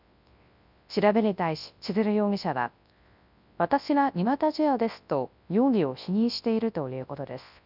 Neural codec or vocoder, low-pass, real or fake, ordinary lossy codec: codec, 24 kHz, 0.9 kbps, WavTokenizer, large speech release; 5.4 kHz; fake; none